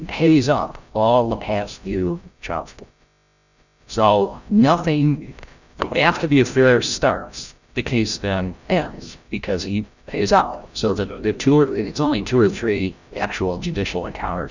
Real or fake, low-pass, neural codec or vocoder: fake; 7.2 kHz; codec, 16 kHz, 0.5 kbps, FreqCodec, larger model